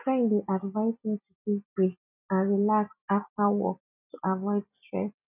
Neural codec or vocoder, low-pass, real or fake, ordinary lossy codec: none; 3.6 kHz; real; none